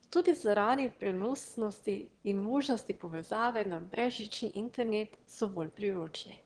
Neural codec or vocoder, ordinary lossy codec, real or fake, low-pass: autoencoder, 22.05 kHz, a latent of 192 numbers a frame, VITS, trained on one speaker; Opus, 16 kbps; fake; 9.9 kHz